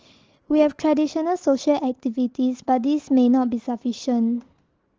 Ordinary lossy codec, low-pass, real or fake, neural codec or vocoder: Opus, 16 kbps; 7.2 kHz; real; none